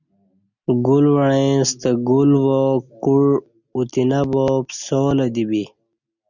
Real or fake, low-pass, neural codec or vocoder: real; 7.2 kHz; none